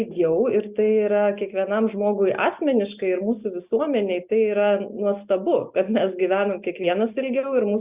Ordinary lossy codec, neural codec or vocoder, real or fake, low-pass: Opus, 64 kbps; none; real; 3.6 kHz